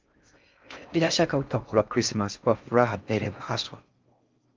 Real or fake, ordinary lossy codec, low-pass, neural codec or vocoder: fake; Opus, 32 kbps; 7.2 kHz; codec, 16 kHz in and 24 kHz out, 0.6 kbps, FocalCodec, streaming, 4096 codes